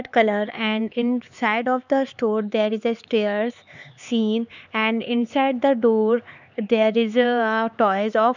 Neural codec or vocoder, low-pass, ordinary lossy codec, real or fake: codec, 16 kHz, 4 kbps, X-Codec, HuBERT features, trained on LibriSpeech; 7.2 kHz; none; fake